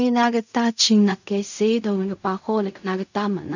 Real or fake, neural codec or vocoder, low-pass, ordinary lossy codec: fake; codec, 16 kHz in and 24 kHz out, 0.4 kbps, LongCat-Audio-Codec, fine tuned four codebook decoder; 7.2 kHz; none